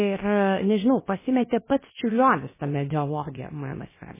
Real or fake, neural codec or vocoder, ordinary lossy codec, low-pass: fake; codec, 16 kHz, about 1 kbps, DyCAST, with the encoder's durations; MP3, 16 kbps; 3.6 kHz